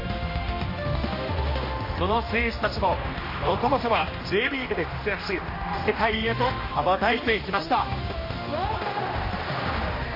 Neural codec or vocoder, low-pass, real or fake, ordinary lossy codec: codec, 16 kHz, 1 kbps, X-Codec, HuBERT features, trained on general audio; 5.4 kHz; fake; MP3, 24 kbps